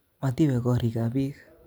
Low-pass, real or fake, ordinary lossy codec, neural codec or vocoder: none; real; none; none